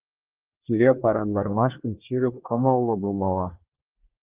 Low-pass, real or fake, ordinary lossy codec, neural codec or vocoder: 3.6 kHz; fake; Opus, 32 kbps; codec, 24 kHz, 1 kbps, SNAC